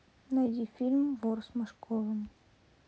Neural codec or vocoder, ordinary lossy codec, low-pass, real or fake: none; none; none; real